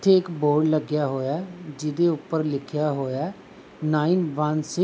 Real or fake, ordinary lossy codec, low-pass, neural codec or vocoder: real; none; none; none